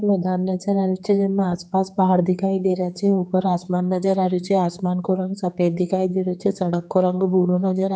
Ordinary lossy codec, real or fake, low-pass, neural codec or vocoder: none; fake; none; codec, 16 kHz, 4 kbps, X-Codec, HuBERT features, trained on general audio